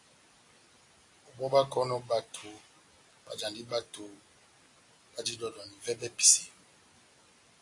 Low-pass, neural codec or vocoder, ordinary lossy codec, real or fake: 10.8 kHz; none; AAC, 48 kbps; real